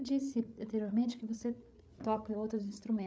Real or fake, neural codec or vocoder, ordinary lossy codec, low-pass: fake; codec, 16 kHz, 8 kbps, FreqCodec, larger model; none; none